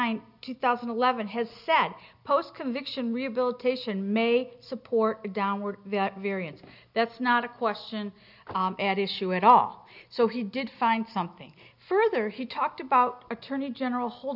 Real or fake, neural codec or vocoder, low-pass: real; none; 5.4 kHz